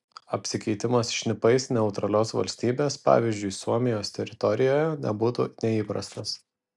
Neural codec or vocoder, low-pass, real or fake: none; 10.8 kHz; real